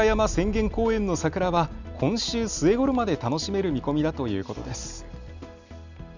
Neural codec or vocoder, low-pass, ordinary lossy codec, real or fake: none; 7.2 kHz; none; real